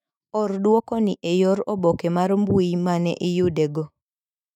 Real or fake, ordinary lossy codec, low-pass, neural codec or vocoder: fake; none; 19.8 kHz; autoencoder, 48 kHz, 128 numbers a frame, DAC-VAE, trained on Japanese speech